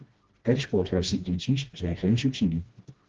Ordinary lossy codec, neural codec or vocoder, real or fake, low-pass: Opus, 16 kbps; codec, 16 kHz, 1 kbps, FreqCodec, smaller model; fake; 7.2 kHz